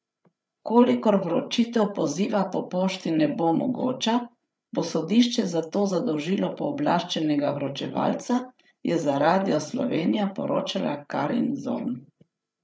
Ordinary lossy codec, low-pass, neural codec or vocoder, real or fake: none; none; codec, 16 kHz, 8 kbps, FreqCodec, larger model; fake